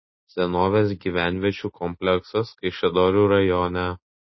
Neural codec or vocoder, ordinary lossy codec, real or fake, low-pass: none; MP3, 24 kbps; real; 7.2 kHz